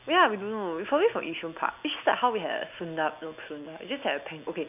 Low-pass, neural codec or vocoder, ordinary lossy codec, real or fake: 3.6 kHz; none; none; real